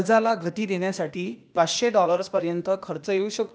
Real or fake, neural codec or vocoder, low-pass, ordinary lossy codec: fake; codec, 16 kHz, 0.8 kbps, ZipCodec; none; none